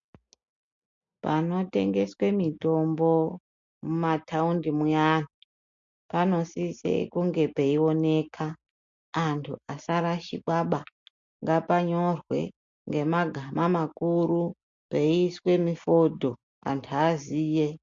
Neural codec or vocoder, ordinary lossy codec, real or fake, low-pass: none; MP3, 48 kbps; real; 7.2 kHz